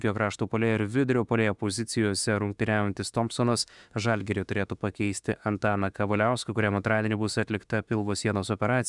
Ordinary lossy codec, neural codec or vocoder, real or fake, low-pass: Opus, 64 kbps; autoencoder, 48 kHz, 32 numbers a frame, DAC-VAE, trained on Japanese speech; fake; 10.8 kHz